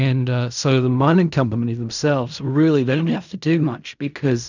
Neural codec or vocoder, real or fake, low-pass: codec, 16 kHz in and 24 kHz out, 0.4 kbps, LongCat-Audio-Codec, fine tuned four codebook decoder; fake; 7.2 kHz